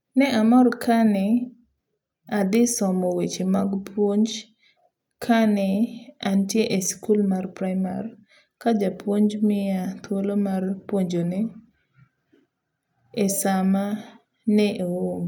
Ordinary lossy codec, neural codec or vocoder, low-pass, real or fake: none; none; 19.8 kHz; real